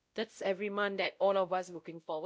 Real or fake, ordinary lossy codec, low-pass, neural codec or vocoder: fake; none; none; codec, 16 kHz, 0.5 kbps, X-Codec, WavLM features, trained on Multilingual LibriSpeech